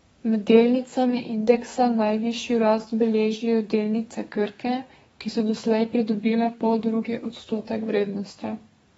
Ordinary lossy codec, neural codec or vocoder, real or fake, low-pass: AAC, 24 kbps; codec, 32 kHz, 1.9 kbps, SNAC; fake; 14.4 kHz